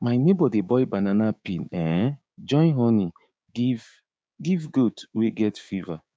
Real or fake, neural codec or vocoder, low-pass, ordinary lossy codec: fake; codec, 16 kHz, 6 kbps, DAC; none; none